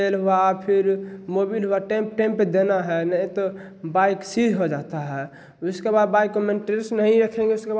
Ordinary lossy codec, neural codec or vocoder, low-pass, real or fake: none; none; none; real